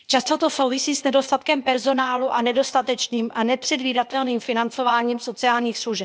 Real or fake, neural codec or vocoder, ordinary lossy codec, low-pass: fake; codec, 16 kHz, 0.8 kbps, ZipCodec; none; none